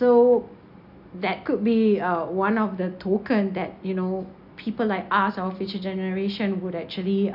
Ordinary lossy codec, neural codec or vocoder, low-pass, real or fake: AAC, 48 kbps; none; 5.4 kHz; real